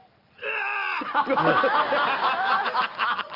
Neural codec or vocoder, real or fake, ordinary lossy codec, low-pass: none; real; Opus, 24 kbps; 5.4 kHz